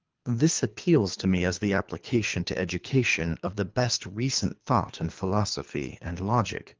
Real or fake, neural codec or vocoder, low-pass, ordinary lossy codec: fake; codec, 24 kHz, 3 kbps, HILCodec; 7.2 kHz; Opus, 32 kbps